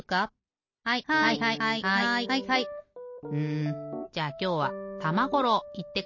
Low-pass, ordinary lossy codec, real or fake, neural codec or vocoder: 7.2 kHz; MP3, 32 kbps; real; none